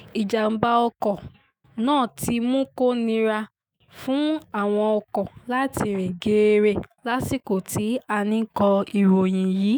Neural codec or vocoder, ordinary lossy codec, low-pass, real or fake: autoencoder, 48 kHz, 128 numbers a frame, DAC-VAE, trained on Japanese speech; none; none; fake